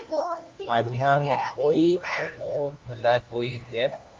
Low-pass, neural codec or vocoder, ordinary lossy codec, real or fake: 7.2 kHz; codec, 16 kHz, 1 kbps, FunCodec, trained on LibriTTS, 50 frames a second; Opus, 32 kbps; fake